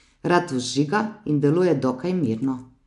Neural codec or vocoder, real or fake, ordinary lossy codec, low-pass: none; real; none; 10.8 kHz